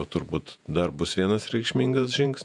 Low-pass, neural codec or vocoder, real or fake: 10.8 kHz; vocoder, 48 kHz, 128 mel bands, Vocos; fake